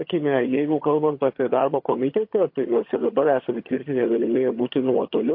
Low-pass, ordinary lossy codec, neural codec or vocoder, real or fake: 5.4 kHz; MP3, 32 kbps; vocoder, 22.05 kHz, 80 mel bands, HiFi-GAN; fake